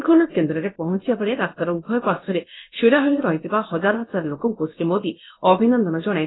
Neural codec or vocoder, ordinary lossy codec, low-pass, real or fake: codec, 16 kHz, about 1 kbps, DyCAST, with the encoder's durations; AAC, 16 kbps; 7.2 kHz; fake